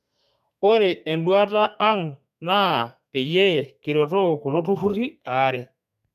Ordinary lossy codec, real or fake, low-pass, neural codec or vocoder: none; fake; 14.4 kHz; codec, 32 kHz, 1.9 kbps, SNAC